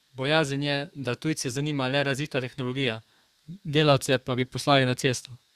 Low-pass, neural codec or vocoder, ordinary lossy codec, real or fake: 14.4 kHz; codec, 32 kHz, 1.9 kbps, SNAC; Opus, 64 kbps; fake